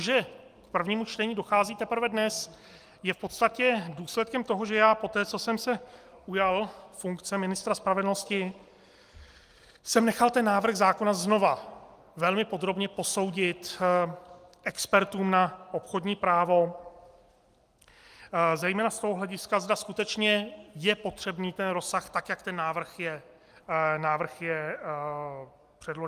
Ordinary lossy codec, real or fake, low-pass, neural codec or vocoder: Opus, 32 kbps; real; 14.4 kHz; none